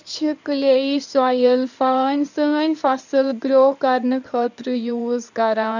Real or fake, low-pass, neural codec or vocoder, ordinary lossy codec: fake; 7.2 kHz; codec, 16 kHz in and 24 kHz out, 2.2 kbps, FireRedTTS-2 codec; none